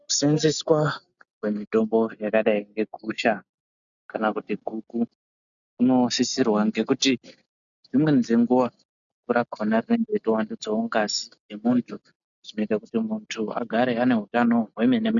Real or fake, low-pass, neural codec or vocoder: real; 7.2 kHz; none